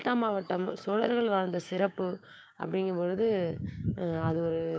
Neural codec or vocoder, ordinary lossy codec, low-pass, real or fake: codec, 16 kHz, 6 kbps, DAC; none; none; fake